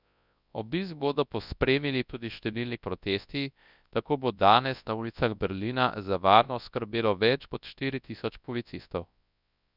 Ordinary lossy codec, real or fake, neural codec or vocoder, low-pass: none; fake; codec, 24 kHz, 0.9 kbps, WavTokenizer, large speech release; 5.4 kHz